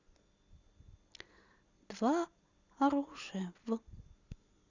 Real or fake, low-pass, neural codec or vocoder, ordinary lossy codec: real; 7.2 kHz; none; Opus, 64 kbps